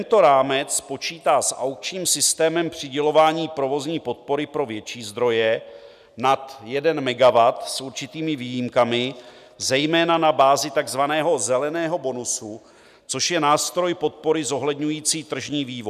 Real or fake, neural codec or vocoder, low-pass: real; none; 14.4 kHz